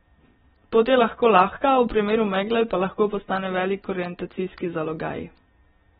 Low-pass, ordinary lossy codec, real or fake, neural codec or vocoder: 19.8 kHz; AAC, 16 kbps; real; none